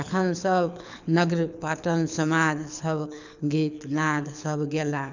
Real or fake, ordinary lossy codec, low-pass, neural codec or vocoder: fake; none; 7.2 kHz; codec, 24 kHz, 6 kbps, HILCodec